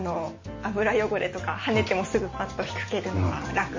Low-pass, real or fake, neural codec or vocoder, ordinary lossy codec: 7.2 kHz; real; none; MP3, 32 kbps